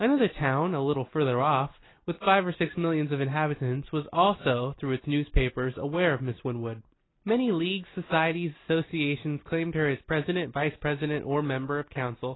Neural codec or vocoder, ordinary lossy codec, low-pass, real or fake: none; AAC, 16 kbps; 7.2 kHz; real